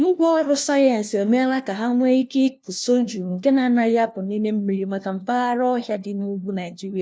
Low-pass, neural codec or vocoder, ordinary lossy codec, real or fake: none; codec, 16 kHz, 1 kbps, FunCodec, trained on LibriTTS, 50 frames a second; none; fake